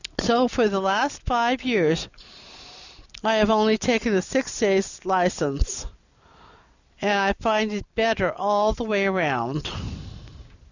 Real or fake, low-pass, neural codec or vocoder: real; 7.2 kHz; none